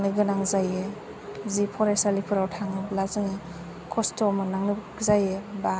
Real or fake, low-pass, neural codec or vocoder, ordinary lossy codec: real; none; none; none